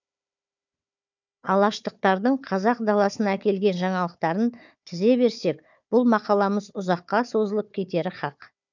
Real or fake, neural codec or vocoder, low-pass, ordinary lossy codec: fake; codec, 16 kHz, 4 kbps, FunCodec, trained on Chinese and English, 50 frames a second; 7.2 kHz; none